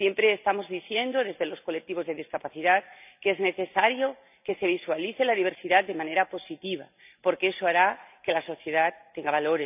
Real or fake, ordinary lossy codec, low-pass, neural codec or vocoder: real; none; 3.6 kHz; none